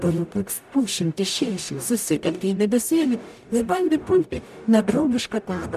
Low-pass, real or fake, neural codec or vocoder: 14.4 kHz; fake; codec, 44.1 kHz, 0.9 kbps, DAC